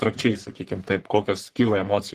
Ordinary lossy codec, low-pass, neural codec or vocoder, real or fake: Opus, 16 kbps; 14.4 kHz; codec, 44.1 kHz, 3.4 kbps, Pupu-Codec; fake